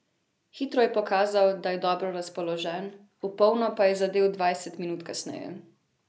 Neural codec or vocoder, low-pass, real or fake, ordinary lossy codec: none; none; real; none